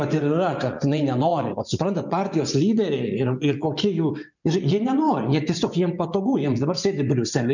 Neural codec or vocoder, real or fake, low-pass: vocoder, 24 kHz, 100 mel bands, Vocos; fake; 7.2 kHz